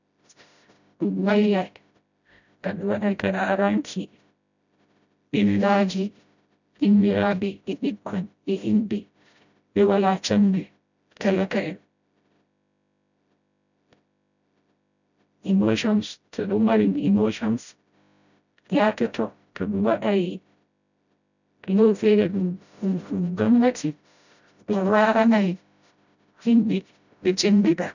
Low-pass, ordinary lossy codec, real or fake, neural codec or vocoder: 7.2 kHz; none; fake; codec, 16 kHz, 0.5 kbps, FreqCodec, smaller model